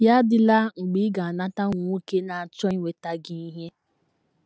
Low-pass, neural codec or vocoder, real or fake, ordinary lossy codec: none; none; real; none